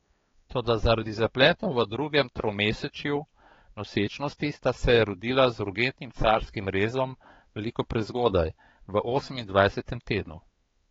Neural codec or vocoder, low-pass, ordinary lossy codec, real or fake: codec, 16 kHz, 4 kbps, X-Codec, HuBERT features, trained on balanced general audio; 7.2 kHz; AAC, 24 kbps; fake